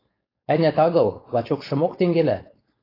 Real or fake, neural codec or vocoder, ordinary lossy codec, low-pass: fake; codec, 16 kHz, 4.8 kbps, FACodec; AAC, 24 kbps; 5.4 kHz